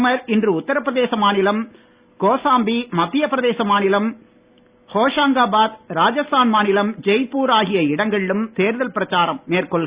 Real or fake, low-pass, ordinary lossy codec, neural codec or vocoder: real; 3.6 kHz; Opus, 64 kbps; none